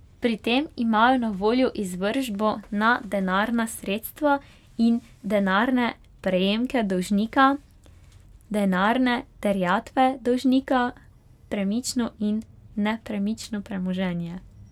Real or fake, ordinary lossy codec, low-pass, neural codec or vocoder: real; none; 19.8 kHz; none